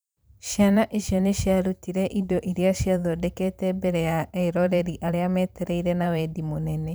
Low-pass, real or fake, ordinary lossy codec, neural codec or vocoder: none; fake; none; vocoder, 44.1 kHz, 128 mel bands every 512 samples, BigVGAN v2